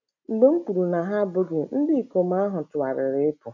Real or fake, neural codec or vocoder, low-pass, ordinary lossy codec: real; none; 7.2 kHz; none